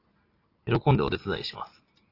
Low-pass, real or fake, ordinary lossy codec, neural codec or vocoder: 5.4 kHz; real; AAC, 24 kbps; none